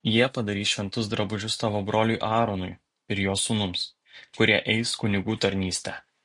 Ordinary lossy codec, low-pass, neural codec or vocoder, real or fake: MP3, 48 kbps; 10.8 kHz; none; real